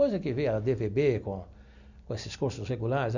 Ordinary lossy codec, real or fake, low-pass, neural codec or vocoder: none; real; 7.2 kHz; none